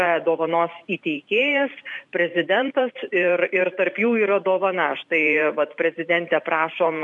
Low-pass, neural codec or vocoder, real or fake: 10.8 kHz; vocoder, 44.1 kHz, 128 mel bands every 512 samples, BigVGAN v2; fake